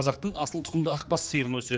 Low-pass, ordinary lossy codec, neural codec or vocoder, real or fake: none; none; codec, 16 kHz, 2 kbps, X-Codec, HuBERT features, trained on general audio; fake